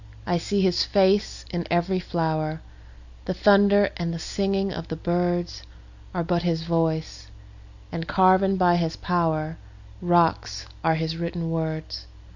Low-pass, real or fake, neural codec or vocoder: 7.2 kHz; real; none